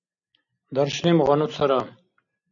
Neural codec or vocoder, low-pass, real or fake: none; 7.2 kHz; real